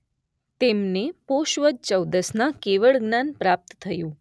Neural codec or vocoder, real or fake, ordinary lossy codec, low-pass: none; real; none; none